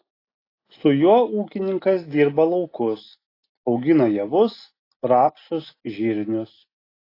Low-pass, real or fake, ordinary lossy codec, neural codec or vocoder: 5.4 kHz; real; AAC, 24 kbps; none